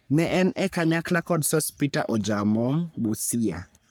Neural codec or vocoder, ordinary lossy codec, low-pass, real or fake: codec, 44.1 kHz, 3.4 kbps, Pupu-Codec; none; none; fake